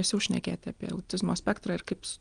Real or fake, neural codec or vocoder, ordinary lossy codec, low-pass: real; none; Opus, 24 kbps; 9.9 kHz